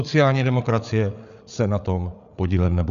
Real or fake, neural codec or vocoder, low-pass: fake; codec, 16 kHz, 8 kbps, FreqCodec, larger model; 7.2 kHz